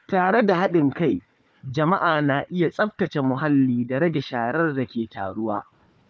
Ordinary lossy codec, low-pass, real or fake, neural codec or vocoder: none; none; fake; codec, 16 kHz, 4 kbps, FunCodec, trained on Chinese and English, 50 frames a second